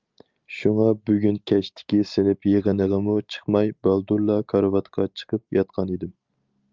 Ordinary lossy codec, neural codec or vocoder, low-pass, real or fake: Opus, 24 kbps; none; 7.2 kHz; real